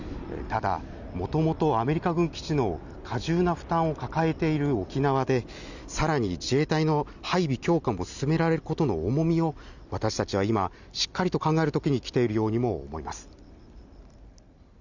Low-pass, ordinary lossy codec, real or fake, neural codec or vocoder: 7.2 kHz; none; real; none